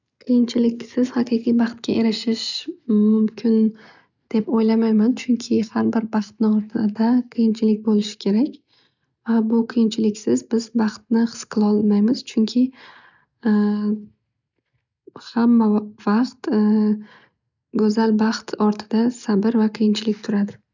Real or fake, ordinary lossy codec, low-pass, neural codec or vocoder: real; none; 7.2 kHz; none